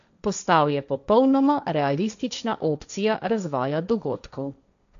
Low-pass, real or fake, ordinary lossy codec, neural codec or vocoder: 7.2 kHz; fake; none; codec, 16 kHz, 1.1 kbps, Voila-Tokenizer